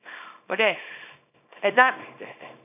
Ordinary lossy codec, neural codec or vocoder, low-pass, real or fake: none; codec, 24 kHz, 0.9 kbps, WavTokenizer, small release; 3.6 kHz; fake